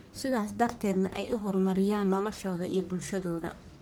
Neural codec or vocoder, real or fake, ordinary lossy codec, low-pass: codec, 44.1 kHz, 1.7 kbps, Pupu-Codec; fake; none; none